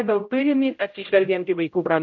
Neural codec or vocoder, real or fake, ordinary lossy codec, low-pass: codec, 16 kHz, 0.5 kbps, X-Codec, HuBERT features, trained on general audio; fake; MP3, 48 kbps; 7.2 kHz